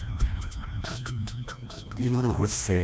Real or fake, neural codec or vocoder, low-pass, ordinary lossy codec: fake; codec, 16 kHz, 1 kbps, FreqCodec, larger model; none; none